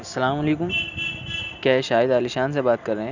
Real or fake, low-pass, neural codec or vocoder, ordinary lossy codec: real; 7.2 kHz; none; none